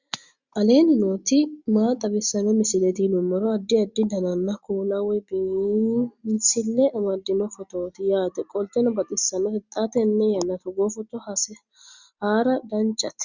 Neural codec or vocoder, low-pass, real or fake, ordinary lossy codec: none; 7.2 kHz; real; Opus, 64 kbps